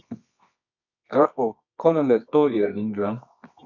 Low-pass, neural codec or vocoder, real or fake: 7.2 kHz; codec, 24 kHz, 0.9 kbps, WavTokenizer, medium music audio release; fake